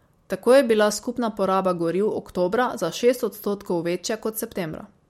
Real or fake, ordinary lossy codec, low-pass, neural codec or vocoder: real; MP3, 64 kbps; 19.8 kHz; none